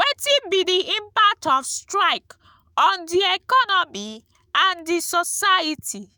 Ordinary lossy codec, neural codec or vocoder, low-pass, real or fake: none; autoencoder, 48 kHz, 128 numbers a frame, DAC-VAE, trained on Japanese speech; none; fake